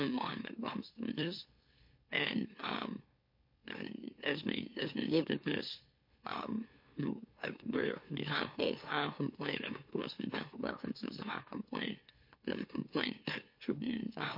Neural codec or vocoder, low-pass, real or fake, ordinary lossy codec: autoencoder, 44.1 kHz, a latent of 192 numbers a frame, MeloTTS; 5.4 kHz; fake; MP3, 32 kbps